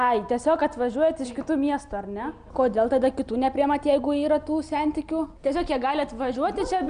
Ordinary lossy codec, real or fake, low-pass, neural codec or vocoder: Opus, 64 kbps; real; 9.9 kHz; none